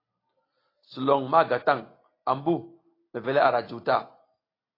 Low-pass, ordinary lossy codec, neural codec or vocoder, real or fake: 5.4 kHz; AAC, 24 kbps; none; real